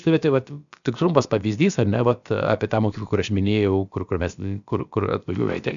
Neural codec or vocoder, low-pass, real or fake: codec, 16 kHz, about 1 kbps, DyCAST, with the encoder's durations; 7.2 kHz; fake